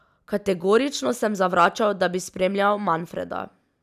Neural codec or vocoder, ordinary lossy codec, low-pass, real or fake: none; none; 14.4 kHz; real